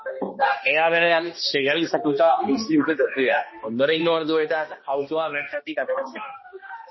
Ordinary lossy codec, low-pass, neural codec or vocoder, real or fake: MP3, 24 kbps; 7.2 kHz; codec, 16 kHz, 1 kbps, X-Codec, HuBERT features, trained on balanced general audio; fake